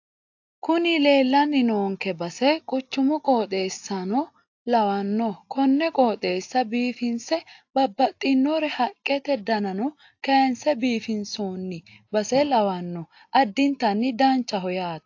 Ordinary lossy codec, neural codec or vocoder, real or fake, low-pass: AAC, 48 kbps; none; real; 7.2 kHz